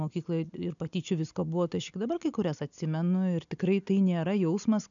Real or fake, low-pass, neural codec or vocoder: real; 7.2 kHz; none